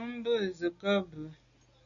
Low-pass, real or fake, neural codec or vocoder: 7.2 kHz; real; none